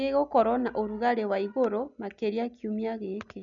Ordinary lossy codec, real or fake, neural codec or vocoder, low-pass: none; real; none; 7.2 kHz